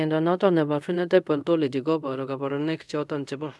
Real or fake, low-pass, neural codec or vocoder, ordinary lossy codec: fake; none; codec, 24 kHz, 0.5 kbps, DualCodec; none